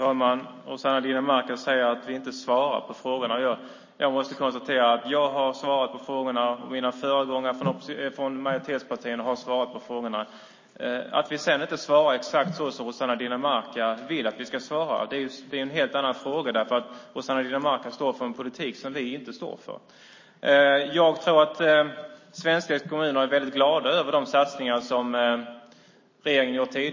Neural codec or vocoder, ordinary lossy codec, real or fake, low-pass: none; MP3, 32 kbps; real; 7.2 kHz